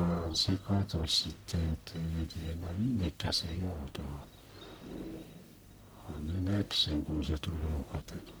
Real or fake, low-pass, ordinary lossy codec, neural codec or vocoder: fake; none; none; codec, 44.1 kHz, 1.7 kbps, Pupu-Codec